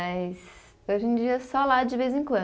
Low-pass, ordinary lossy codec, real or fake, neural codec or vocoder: none; none; real; none